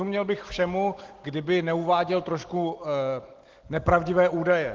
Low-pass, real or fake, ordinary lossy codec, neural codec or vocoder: 7.2 kHz; real; Opus, 16 kbps; none